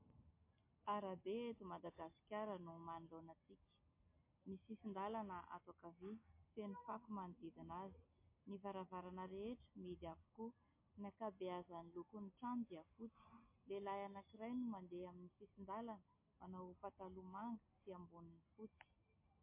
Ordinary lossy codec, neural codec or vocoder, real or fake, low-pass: MP3, 24 kbps; none; real; 3.6 kHz